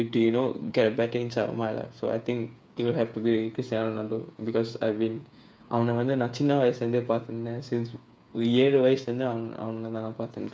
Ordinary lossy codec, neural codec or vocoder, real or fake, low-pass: none; codec, 16 kHz, 8 kbps, FreqCodec, smaller model; fake; none